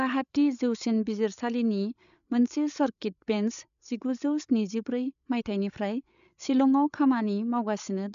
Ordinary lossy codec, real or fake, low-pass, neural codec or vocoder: none; fake; 7.2 kHz; codec, 16 kHz, 8 kbps, FreqCodec, larger model